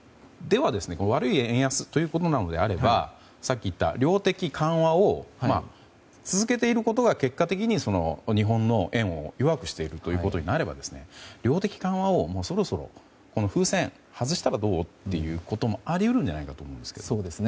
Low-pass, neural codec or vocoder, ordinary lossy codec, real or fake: none; none; none; real